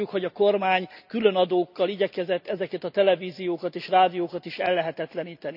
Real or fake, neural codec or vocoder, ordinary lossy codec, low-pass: real; none; none; 5.4 kHz